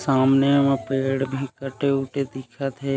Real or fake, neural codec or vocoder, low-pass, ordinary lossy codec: real; none; none; none